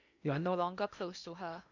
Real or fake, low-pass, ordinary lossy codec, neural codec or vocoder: fake; 7.2 kHz; none; codec, 16 kHz in and 24 kHz out, 0.8 kbps, FocalCodec, streaming, 65536 codes